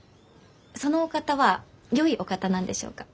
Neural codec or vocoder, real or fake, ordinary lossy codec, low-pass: none; real; none; none